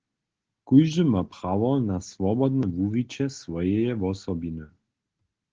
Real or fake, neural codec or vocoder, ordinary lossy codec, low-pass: real; none; Opus, 16 kbps; 7.2 kHz